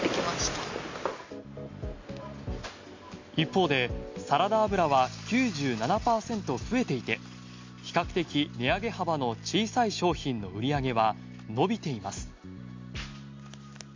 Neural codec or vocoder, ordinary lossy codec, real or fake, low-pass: none; MP3, 64 kbps; real; 7.2 kHz